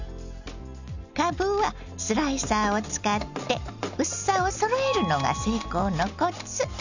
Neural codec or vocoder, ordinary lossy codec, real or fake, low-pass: none; none; real; 7.2 kHz